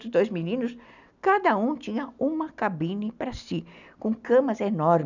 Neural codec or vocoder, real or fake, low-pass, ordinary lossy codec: none; real; 7.2 kHz; none